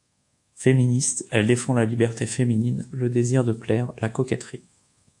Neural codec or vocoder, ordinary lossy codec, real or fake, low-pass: codec, 24 kHz, 1.2 kbps, DualCodec; AAC, 64 kbps; fake; 10.8 kHz